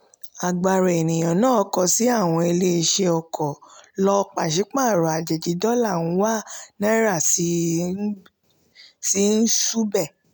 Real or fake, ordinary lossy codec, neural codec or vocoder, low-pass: real; none; none; none